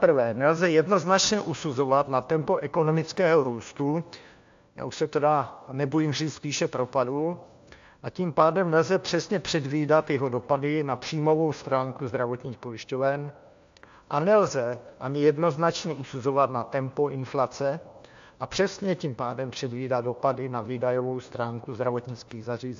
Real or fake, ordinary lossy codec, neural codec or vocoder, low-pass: fake; AAC, 64 kbps; codec, 16 kHz, 1 kbps, FunCodec, trained on LibriTTS, 50 frames a second; 7.2 kHz